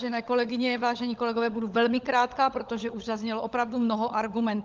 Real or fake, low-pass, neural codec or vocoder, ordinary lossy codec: fake; 7.2 kHz; codec, 16 kHz, 16 kbps, FunCodec, trained on LibriTTS, 50 frames a second; Opus, 24 kbps